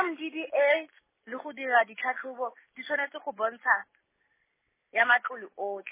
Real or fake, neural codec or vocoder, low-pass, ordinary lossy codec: real; none; 3.6 kHz; MP3, 16 kbps